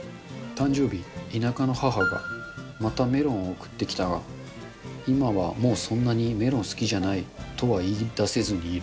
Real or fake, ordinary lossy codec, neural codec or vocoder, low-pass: real; none; none; none